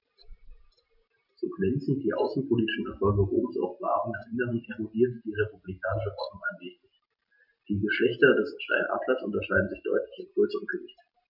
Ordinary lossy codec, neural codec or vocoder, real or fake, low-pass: none; none; real; 5.4 kHz